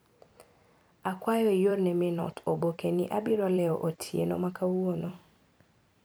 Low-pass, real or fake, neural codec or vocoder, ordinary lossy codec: none; fake; vocoder, 44.1 kHz, 128 mel bands every 256 samples, BigVGAN v2; none